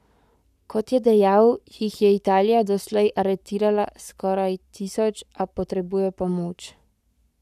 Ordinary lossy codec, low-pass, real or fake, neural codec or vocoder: none; 14.4 kHz; fake; codec, 44.1 kHz, 7.8 kbps, Pupu-Codec